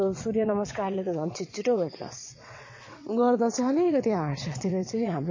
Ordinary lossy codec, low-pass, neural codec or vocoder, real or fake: MP3, 32 kbps; 7.2 kHz; vocoder, 22.05 kHz, 80 mel bands, WaveNeXt; fake